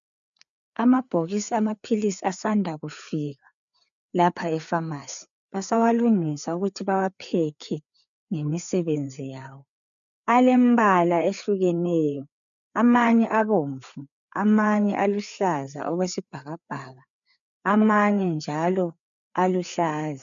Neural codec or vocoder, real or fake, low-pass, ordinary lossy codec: codec, 16 kHz, 4 kbps, FreqCodec, larger model; fake; 7.2 kHz; MP3, 96 kbps